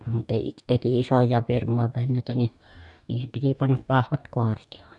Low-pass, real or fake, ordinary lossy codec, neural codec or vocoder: 10.8 kHz; fake; none; codec, 44.1 kHz, 2.6 kbps, DAC